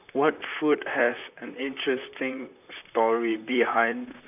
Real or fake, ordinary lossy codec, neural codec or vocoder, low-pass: fake; none; vocoder, 44.1 kHz, 128 mel bands, Pupu-Vocoder; 3.6 kHz